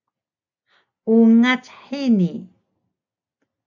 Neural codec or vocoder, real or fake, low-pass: none; real; 7.2 kHz